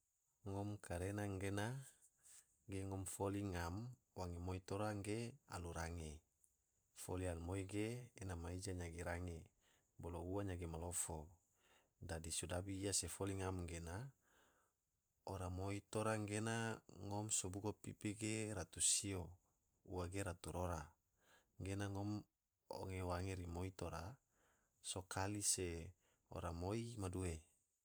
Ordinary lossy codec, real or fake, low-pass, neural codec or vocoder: none; fake; none; vocoder, 44.1 kHz, 128 mel bands every 512 samples, BigVGAN v2